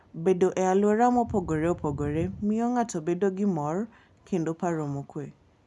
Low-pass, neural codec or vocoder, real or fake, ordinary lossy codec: none; none; real; none